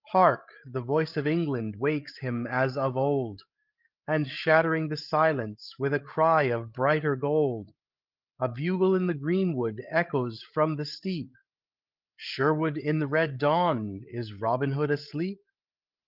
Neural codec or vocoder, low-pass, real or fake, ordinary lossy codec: codec, 16 kHz, 8 kbps, FreqCodec, larger model; 5.4 kHz; fake; Opus, 32 kbps